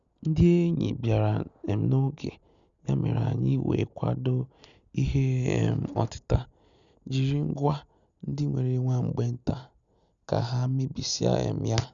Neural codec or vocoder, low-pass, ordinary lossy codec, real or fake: none; 7.2 kHz; none; real